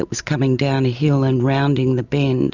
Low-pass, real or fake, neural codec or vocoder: 7.2 kHz; real; none